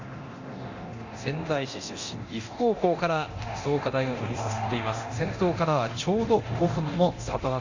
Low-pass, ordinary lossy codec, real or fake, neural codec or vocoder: 7.2 kHz; Opus, 64 kbps; fake; codec, 24 kHz, 0.9 kbps, DualCodec